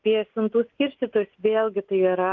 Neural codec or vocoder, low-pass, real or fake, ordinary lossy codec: none; 7.2 kHz; real; Opus, 32 kbps